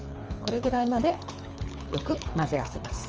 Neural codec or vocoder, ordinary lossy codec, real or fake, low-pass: codec, 24 kHz, 6 kbps, HILCodec; Opus, 24 kbps; fake; 7.2 kHz